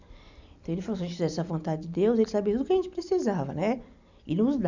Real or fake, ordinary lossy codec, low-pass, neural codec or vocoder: real; none; 7.2 kHz; none